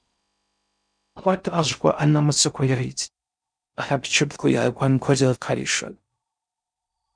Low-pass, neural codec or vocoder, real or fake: 9.9 kHz; codec, 16 kHz in and 24 kHz out, 0.6 kbps, FocalCodec, streaming, 4096 codes; fake